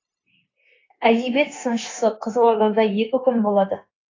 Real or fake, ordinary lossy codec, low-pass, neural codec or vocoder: fake; AAC, 32 kbps; 7.2 kHz; codec, 16 kHz, 0.9 kbps, LongCat-Audio-Codec